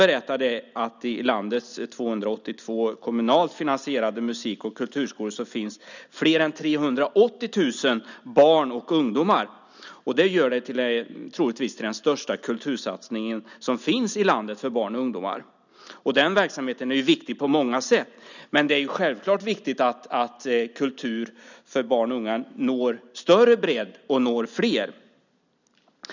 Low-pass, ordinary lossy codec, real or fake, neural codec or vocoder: 7.2 kHz; none; real; none